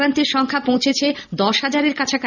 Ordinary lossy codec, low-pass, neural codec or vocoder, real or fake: none; 7.2 kHz; none; real